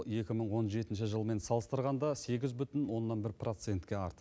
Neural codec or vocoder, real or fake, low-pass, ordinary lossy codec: none; real; none; none